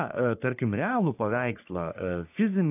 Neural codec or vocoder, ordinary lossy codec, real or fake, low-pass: codec, 44.1 kHz, 3.4 kbps, Pupu-Codec; AAC, 32 kbps; fake; 3.6 kHz